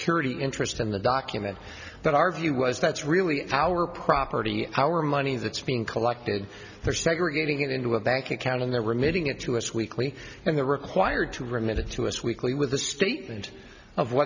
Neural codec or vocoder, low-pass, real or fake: none; 7.2 kHz; real